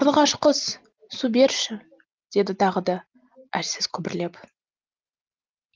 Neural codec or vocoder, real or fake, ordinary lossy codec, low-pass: none; real; Opus, 24 kbps; 7.2 kHz